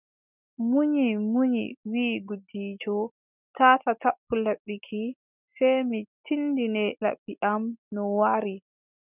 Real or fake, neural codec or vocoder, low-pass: real; none; 3.6 kHz